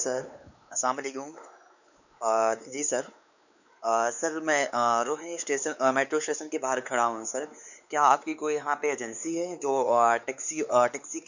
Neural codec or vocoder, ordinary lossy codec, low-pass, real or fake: codec, 16 kHz, 4 kbps, X-Codec, WavLM features, trained on Multilingual LibriSpeech; none; 7.2 kHz; fake